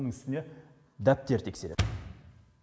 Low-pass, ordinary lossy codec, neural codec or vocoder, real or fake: none; none; none; real